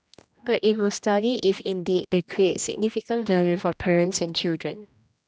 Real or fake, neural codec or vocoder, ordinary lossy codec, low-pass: fake; codec, 16 kHz, 1 kbps, X-Codec, HuBERT features, trained on general audio; none; none